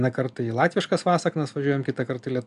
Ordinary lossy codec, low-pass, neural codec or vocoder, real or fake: AAC, 96 kbps; 10.8 kHz; none; real